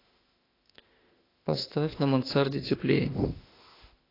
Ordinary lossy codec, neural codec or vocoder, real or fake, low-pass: AAC, 24 kbps; autoencoder, 48 kHz, 32 numbers a frame, DAC-VAE, trained on Japanese speech; fake; 5.4 kHz